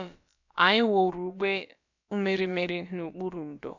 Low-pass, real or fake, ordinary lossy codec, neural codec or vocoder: 7.2 kHz; fake; none; codec, 16 kHz, about 1 kbps, DyCAST, with the encoder's durations